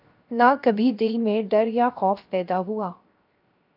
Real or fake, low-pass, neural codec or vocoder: fake; 5.4 kHz; codec, 16 kHz, 0.7 kbps, FocalCodec